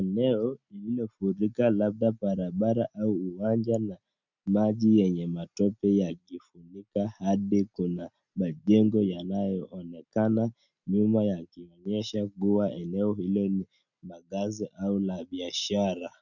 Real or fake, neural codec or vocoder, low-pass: real; none; 7.2 kHz